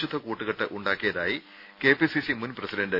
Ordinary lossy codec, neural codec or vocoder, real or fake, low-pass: none; none; real; 5.4 kHz